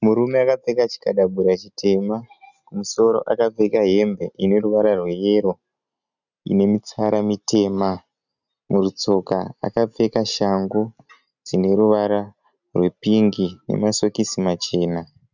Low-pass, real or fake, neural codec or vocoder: 7.2 kHz; real; none